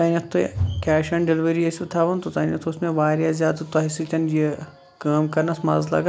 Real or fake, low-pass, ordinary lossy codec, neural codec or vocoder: real; none; none; none